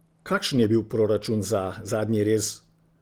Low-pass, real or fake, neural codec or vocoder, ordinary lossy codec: 19.8 kHz; real; none; Opus, 24 kbps